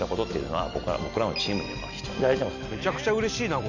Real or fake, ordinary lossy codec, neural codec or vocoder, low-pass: real; none; none; 7.2 kHz